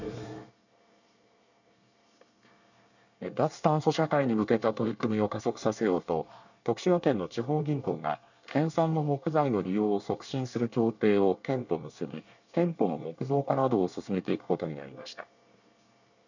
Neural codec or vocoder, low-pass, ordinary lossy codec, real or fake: codec, 24 kHz, 1 kbps, SNAC; 7.2 kHz; none; fake